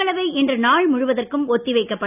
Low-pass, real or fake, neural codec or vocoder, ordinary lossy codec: 3.6 kHz; real; none; none